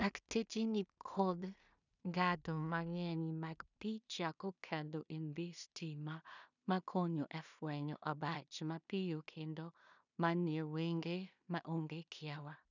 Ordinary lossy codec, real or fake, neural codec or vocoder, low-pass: none; fake; codec, 16 kHz in and 24 kHz out, 0.4 kbps, LongCat-Audio-Codec, two codebook decoder; 7.2 kHz